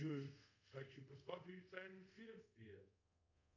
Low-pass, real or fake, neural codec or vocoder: 7.2 kHz; fake; codec, 24 kHz, 0.5 kbps, DualCodec